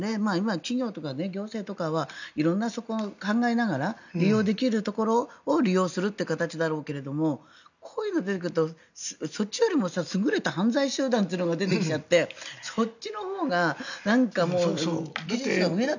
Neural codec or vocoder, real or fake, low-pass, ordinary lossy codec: none; real; 7.2 kHz; none